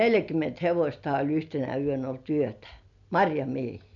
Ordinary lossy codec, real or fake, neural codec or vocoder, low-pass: none; real; none; 7.2 kHz